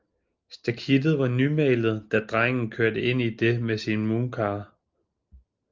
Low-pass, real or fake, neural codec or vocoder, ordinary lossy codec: 7.2 kHz; real; none; Opus, 24 kbps